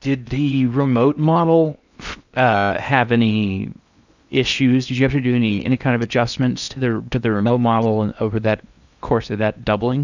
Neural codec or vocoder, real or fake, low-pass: codec, 16 kHz in and 24 kHz out, 0.8 kbps, FocalCodec, streaming, 65536 codes; fake; 7.2 kHz